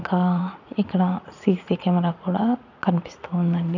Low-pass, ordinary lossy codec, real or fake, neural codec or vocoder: 7.2 kHz; none; fake; vocoder, 44.1 kHz, 80 mel bands, Vocos